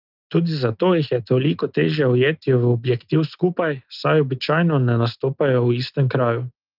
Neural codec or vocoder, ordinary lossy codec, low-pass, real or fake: none; Opus, 32 kbps; 5.4 kHz; real